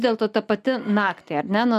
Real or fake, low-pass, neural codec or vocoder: real; 14.4 kHz; none